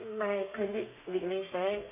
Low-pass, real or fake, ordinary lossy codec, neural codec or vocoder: 3.6 kHz; fake; none; codec, 32 kHz, 1.9 kbps, SNAC